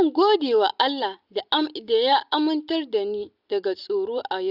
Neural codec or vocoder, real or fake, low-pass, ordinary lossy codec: none; real; 5.4 kHz; Opus, 64 kbps